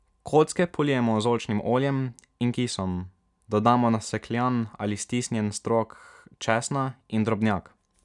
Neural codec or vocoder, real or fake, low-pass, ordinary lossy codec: none; real; 10.8 kHz; none